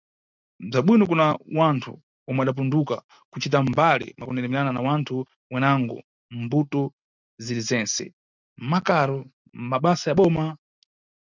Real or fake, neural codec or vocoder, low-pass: real; none; 7.2 kHz